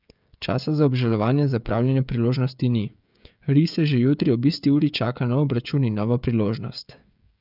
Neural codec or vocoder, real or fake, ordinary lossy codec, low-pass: codec, 16 kHz, 16 kbps, FreqCodec, smaller model; fake; none; 5.4 kHz